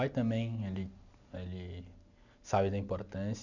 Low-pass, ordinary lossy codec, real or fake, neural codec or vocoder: 7.2 kHz; none; real; none